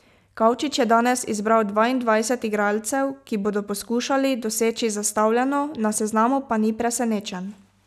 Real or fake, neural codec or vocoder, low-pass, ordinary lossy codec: real; none; 14.4 kHz; none